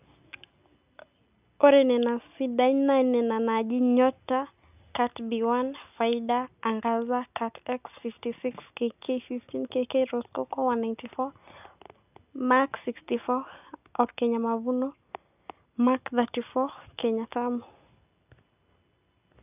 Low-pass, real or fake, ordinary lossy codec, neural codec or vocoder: 3.6 kHz; real; none; none